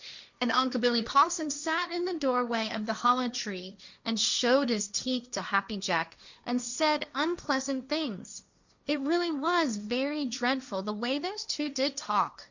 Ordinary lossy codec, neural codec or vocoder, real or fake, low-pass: Opus, 64 kbps; codec, 16 kHz, 1.1 kbps, Voila-Tokenizer; fake; 7.2 kHz